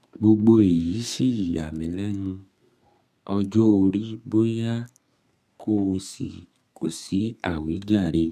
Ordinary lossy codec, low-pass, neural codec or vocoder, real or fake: none; 14.4 kHz; codec, 32 kHz, 1.9 kbps, SNAC; fake